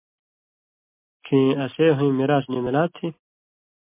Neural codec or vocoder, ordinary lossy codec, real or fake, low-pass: none; MP3, 24 kbps; real; 3.6 kHz